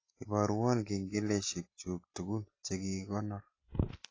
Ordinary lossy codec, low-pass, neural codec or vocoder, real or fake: AAC, 32 kbps; 7.2 kHz; none; real